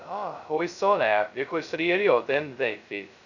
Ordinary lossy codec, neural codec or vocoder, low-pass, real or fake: none; codec, 16 kHz, 0.2 kbps, FocalCodec; 7.2 kHz; fake